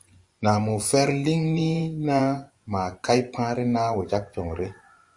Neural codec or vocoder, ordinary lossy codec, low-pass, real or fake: vocoder, 24 kHz, 100 mel bands, Vocos; Opus, 64 kbps; 10.8 kHz; fake